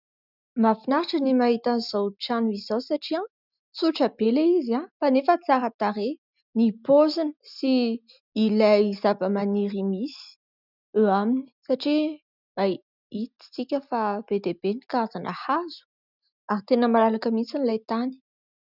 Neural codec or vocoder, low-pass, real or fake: none; 5.4 kHz; real